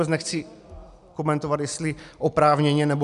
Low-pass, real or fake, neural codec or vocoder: 10.8 kHz; real; none